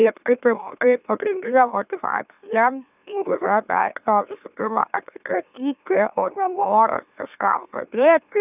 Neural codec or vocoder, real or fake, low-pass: autoencoder, 44.1 kHz, a latent of 192 numbers a frame, MeloTTS; fake; 3.6 kHz